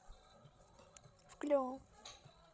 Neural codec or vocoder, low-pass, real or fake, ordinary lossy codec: codec, 16 kHz, 16 kbps, FreqCodec, larger model; none; fake; none